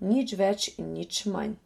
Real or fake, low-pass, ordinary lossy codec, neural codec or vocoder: real; 19.8 kHz; MP3, 64 kbps; none